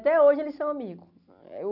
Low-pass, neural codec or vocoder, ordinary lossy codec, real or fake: 5.4 kHz; none; none; real